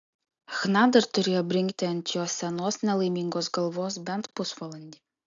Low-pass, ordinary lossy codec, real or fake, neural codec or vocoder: 7.2 kHz; AAC, 64 kbps; real; none